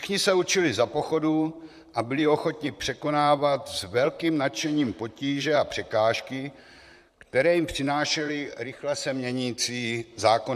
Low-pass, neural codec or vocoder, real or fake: 14.4 kHz; vocoder, 44.1 kHz, 128 mel bands, Pupu-Vocoder; fake